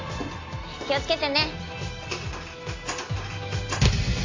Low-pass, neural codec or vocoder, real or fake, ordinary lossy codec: 7.2 kHz; none; real; AAC, 32 kbps